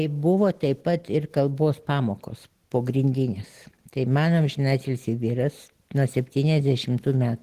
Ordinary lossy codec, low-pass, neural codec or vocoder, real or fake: Opus, 16 kbps; 14.4 kHz; none; real